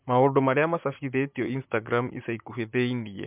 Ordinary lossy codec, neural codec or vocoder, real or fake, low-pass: MP3, 32 kbps; vocoder, 44.1 kHz, 128 mel bands, Pupu-Vocoder; fake; 3.6 kHz